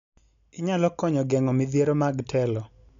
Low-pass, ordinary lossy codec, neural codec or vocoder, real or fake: 7.2 kHz; none; none; real